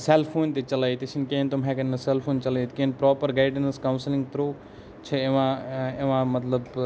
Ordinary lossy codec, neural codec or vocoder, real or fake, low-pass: none; none; real; none